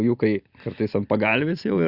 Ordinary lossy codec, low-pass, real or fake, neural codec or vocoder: Opus, 64 kbps; 5.4 kHz; real; none